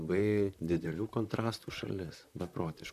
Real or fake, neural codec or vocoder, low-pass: fake; vocoder, 44.1 kHz, 128 mel bands, Pupu-Vocoder; 14.4 kHz